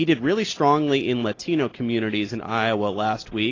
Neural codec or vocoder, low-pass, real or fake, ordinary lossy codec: none; 7.2 kHz; real; AAC, 32 kbps